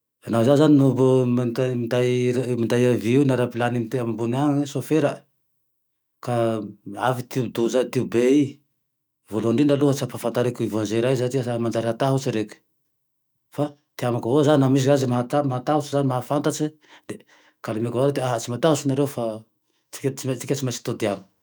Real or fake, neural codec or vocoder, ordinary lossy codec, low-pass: fake; autoencoder, 48 kHz, 128 numbers a frame, DAC-VAE, trained on Japanese speech; none; none